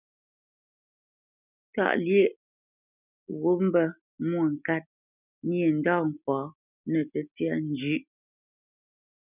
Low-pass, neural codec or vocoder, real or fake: 3.6 kHz; none; real